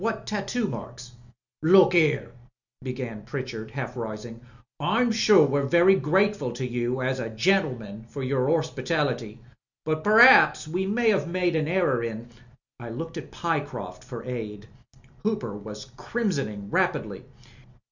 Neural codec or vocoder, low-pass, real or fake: none; 7.2 kHz; real